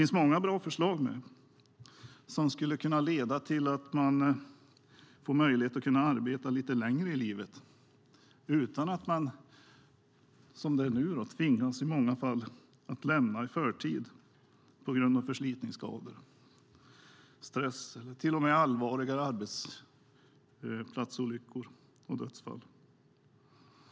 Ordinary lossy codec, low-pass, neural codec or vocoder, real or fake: none; none; none; real